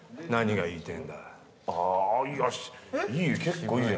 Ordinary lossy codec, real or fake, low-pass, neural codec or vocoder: none; real; none; none